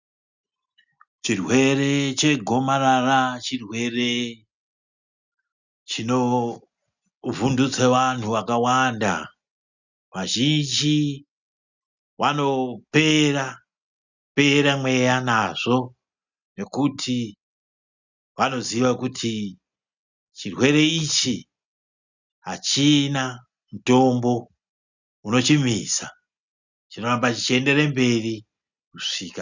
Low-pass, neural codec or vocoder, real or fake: 7.2 kHz; none; real